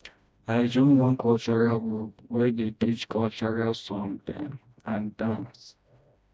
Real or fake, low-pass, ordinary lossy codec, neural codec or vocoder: fake; none; none; codec, 16 kHz, 1 kbps, FreqCodec, smaller model